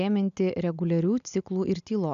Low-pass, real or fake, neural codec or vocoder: 7.2 kHz; real; none